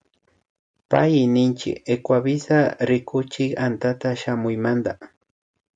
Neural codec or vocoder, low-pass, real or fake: none; 9.9 kHz; real